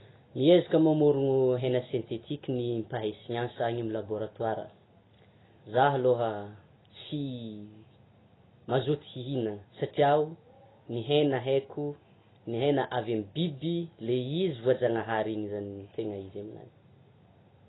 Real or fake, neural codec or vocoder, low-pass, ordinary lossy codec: real; none; 7.2 kHz; AAC, 16 kbps